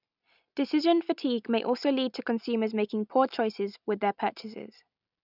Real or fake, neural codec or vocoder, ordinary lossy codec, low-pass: real; none; none; 5.4 kHz